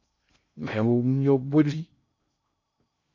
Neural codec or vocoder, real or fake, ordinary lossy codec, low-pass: codec, 16 kHz in and 24 kHz out, 0.6 kbps, FocalCodec, streaming, 2048 codes; fake; AAC, 48 kbps; 7.2 kHz